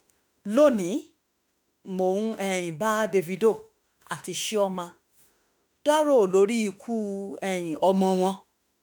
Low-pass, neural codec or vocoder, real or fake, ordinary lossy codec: none; autoencoder, 48 kHz, 32 numbers a frame, DAC-VAE, trained on Japanese speech; fake; none